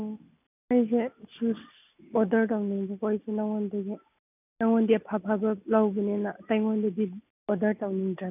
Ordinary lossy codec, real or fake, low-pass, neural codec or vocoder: none; real; 3.6 kHz; none